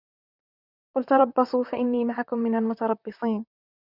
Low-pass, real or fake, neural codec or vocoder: 5.4 kHz; fake; vocoder, 44.1 kHz, 128 mel bands, Pupu-Vocoder